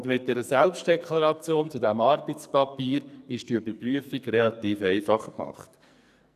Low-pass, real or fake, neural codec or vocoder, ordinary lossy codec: 14.4 kHz; fake; codec, 44.1 kHz, 2.6 kbps, SNAC; none